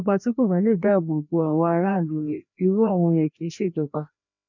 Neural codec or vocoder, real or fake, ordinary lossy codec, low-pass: codec, 16 kHz, 1 kbps, FreqCodec, larger model; fake; none; 7.2 kHz